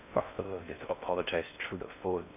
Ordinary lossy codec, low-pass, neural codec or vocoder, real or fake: none; 3.6 kHz; codec, 16 kHz in and 24 kHz out, 0.6 kbps, FocalCodec, streaming, 4096 codes; fake